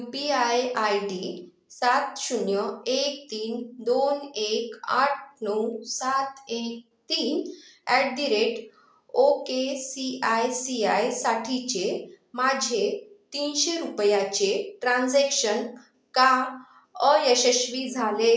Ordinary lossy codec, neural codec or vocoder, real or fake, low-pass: none; none; real; none